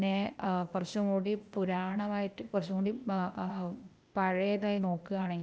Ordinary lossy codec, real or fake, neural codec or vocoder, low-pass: none; fake; codec, 16 kHz, 0.8 kbps, ZipCodec; none